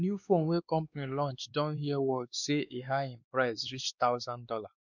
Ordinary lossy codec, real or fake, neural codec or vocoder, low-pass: none; fake; codec, 16 kHz, 2 kbps, X-Codec, WavLM features, trained on Multilingual LibriSpeech; 7.2 kHz